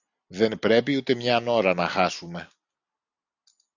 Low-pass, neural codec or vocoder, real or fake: 7.2 kHz; none; real